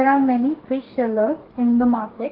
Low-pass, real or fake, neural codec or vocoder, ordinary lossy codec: 5.4 kHz; fake; codec, 44.1 kHz, 2.6 kbps, DAC; Opus, 16 kbps